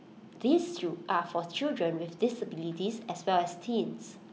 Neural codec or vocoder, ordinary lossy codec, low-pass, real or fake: none; none; none; real